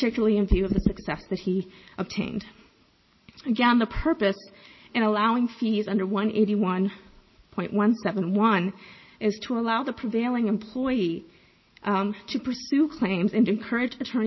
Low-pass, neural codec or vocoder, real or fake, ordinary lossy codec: 7.2 kHz; none; real; MP3, 24 kbps